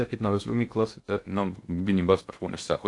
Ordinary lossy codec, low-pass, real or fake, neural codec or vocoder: AAC, 48 kbps; 10.8 kHz; fake; codec, 16 kHz in and 24 kHz out, 0.6 kbps, FocalCodec, streaming, 2048 codes